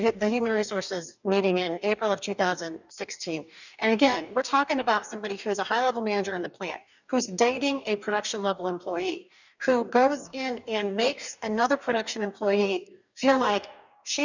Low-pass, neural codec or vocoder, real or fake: 7.2 kHz; codec, 44.1 kHz, 2.6 kbps, DAC; fake